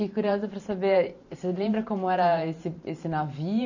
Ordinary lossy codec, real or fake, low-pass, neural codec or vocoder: none; fake; 7.2 kHz; vocoder, 44.1 kHz, 128 mel bands every 512 samples, BigVGAN v2